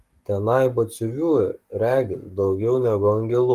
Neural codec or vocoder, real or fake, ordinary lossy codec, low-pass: codec, 44.1 kHz, 7.8 kbps, Pupu-Codec; fake; Opus, 32 kbps; 14.4 kHz